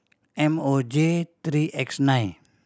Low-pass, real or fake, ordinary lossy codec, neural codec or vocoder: none; real; none; none